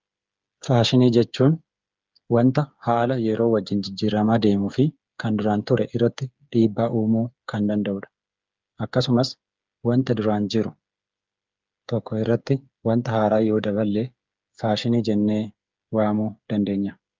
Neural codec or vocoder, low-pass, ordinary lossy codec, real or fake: codec, 16 kHz, 8 kbps, FreqCodec, smaller model; 7.2 kHz; Opus, 24 kbps; fake